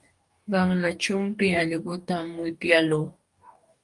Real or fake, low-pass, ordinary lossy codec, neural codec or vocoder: fake; 10.8 kHz; Opus, 32 kbps; codec, 44.1 kHz, 2.6 kbps, DAC